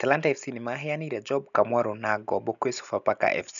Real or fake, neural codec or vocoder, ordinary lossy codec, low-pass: real; none; none; 7.2 kHz